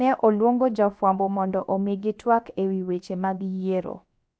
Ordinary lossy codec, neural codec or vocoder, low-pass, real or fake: none; codec, 16 kHz, about 1 kbps, DyCAST, with the encoder's durations; none; fake